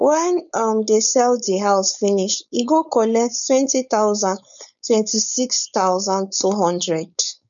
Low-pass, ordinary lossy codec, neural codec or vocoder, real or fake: 7.2 kHz; none; codec, 16 kHz, 4.8 kbps, FACodec; fake